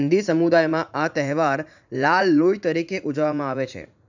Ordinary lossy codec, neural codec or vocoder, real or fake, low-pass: none; vocoder, 44.1 kHz, 80 mel bands, Vocos; fake; 7.2 kHz